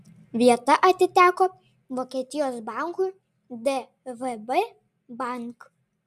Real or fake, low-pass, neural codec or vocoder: real; 14.4 kHz; none